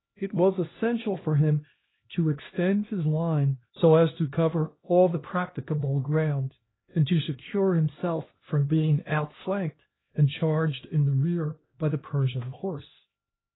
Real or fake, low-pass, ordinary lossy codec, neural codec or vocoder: fake; 7.2 kHz; AAC, 16 kbps; codec, 16 kHz, 1 kbps, X-Codec, HuBERT features, trained on LibriSpeech